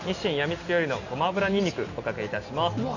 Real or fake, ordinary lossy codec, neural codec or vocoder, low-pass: real; none; none; 7.2 kHz